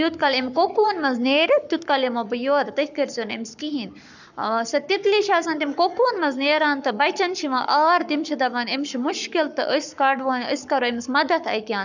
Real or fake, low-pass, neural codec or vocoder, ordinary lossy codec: fake; 7.2 kHz; codec, 44.1 kHz, 7.8 kbps, Pupu-Codec; none